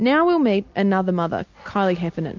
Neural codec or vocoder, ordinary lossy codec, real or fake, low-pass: none; MP3, 64 kbps; real; 7.2 kHz